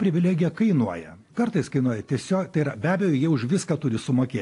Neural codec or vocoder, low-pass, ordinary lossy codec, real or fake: none; 10.8 kHz; AAC, 48 kbps; real